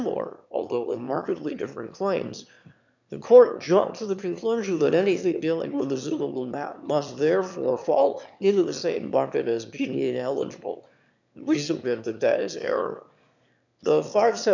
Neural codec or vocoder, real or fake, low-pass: autoencoder, 22.05 kHz, a latent of 192 numbers a frame, VITS, trained on one speaker; fake; 7.2 kHz